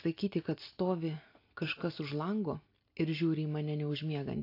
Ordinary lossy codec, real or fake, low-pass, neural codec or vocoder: AAC, 32 kbps; real; 5.4 kHz; none